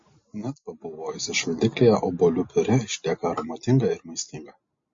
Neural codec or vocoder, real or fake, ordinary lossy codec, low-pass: none; real; MP3, 32 kbps; 7.2 kHz